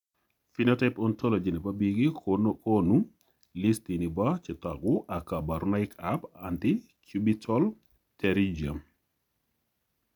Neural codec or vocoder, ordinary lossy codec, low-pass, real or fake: none; MP3, 96 kbps; 19.8 kHz; real